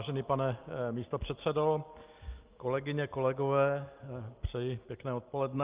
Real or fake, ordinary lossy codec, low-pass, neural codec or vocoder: real; Opus, 24 kbps; 3.6 kHz; none